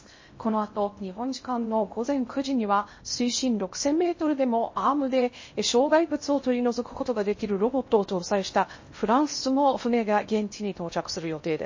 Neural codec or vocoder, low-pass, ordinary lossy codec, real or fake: codec, 16 kHz in and 24 kHz out, 0.6 kbps, FocalCodec, streaming, 2048 codes; 7.2 kHz; MP3, 32 kbps; fake